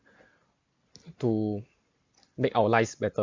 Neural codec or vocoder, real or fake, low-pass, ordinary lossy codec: none; real; 7.2 kHz; MP3, 64 kbps